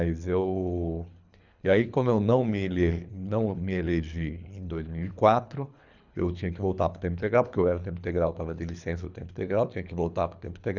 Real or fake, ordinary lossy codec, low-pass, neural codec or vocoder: fake; none; 7.2 kHz; codec, 24 kHz, 3 kbps, HILCodec